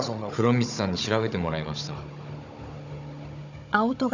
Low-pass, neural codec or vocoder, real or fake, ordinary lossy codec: 7.2 kHz; codec, 16 kHz, 16 kbps, FunCodec, trained on Chinese and English, 50 frames a second; fake; none